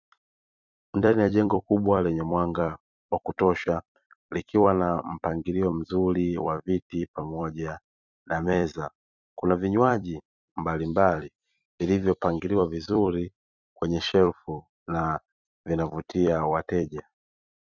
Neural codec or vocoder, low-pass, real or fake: vocoder, 44.1 kHz, 128 mel bands every 256 samples, BigVGAN v2; 7.2 kHz; fake